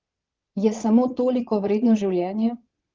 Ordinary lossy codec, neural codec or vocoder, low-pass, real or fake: Opus, 16 kbps; vocoder, 22.05 kHz, 80 mel bands, Vocos; 7.2 kHz; fake